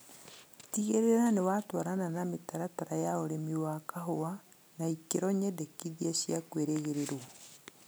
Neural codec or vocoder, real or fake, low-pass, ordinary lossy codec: none; real; none; none